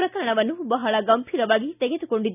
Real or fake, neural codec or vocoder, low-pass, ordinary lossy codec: real; none; 3.6 kHz; none